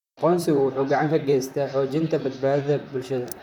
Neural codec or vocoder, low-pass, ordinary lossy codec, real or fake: vocoder, 44.1 kHz, 128 mel bands, Pupu-Vocoder; 19.8 kHz; none; fake